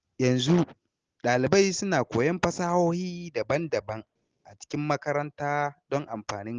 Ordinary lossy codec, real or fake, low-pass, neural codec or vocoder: Opus, 16 kbps; real; 7.2 kHz; none